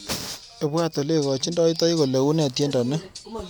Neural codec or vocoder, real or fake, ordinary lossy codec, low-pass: none; real; none; none